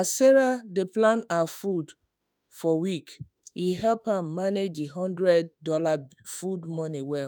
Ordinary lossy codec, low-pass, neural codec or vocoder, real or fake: none; none; autoencoder, 48 kHz, 32 numbers a frame, DAC-VAE, trained on Japanese speech; fake